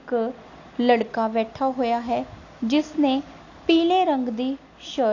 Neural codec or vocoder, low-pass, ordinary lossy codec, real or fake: none; 7.2 kHz; AAC, 48 kbps; real